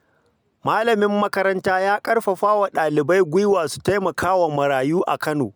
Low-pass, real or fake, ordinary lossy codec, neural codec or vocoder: none; real; none; none